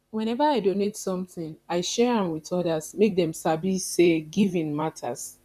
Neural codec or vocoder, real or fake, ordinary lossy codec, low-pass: vocoder, 44.1 kHz, 128 mel bands, Pupu-Vocoder; fake; none; 14.4 kHz